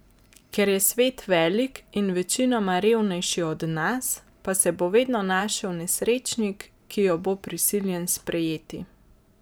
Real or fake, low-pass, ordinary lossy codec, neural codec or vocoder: fake; none; none; vocoder, 44.1 kHz, 128 mel bands every 512 samples, BigVGAN v2